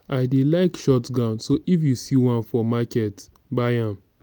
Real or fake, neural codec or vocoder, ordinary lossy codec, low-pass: real; none; none; none